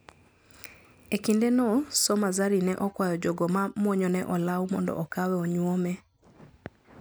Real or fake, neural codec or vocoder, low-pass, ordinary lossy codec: real; none; none; none